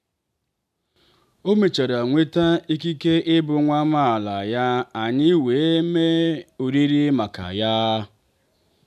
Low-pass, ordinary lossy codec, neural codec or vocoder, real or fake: 14.4 kHz; none; none; real